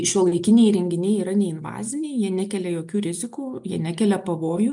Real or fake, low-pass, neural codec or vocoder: real; 10.8 kHz; none